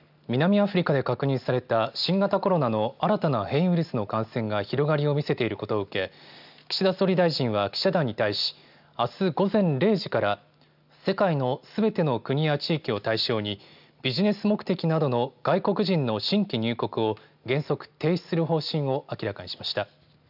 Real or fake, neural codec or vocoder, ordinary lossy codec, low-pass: real; none; none; 5.4 kHz